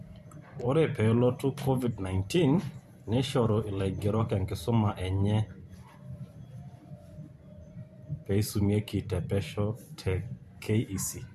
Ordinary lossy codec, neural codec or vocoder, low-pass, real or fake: MP3, 64 kbps; vocoder, 44.1 kHz, 128 mel bands every 512 samples, BigVGAN v2; 14.4 kHz; fake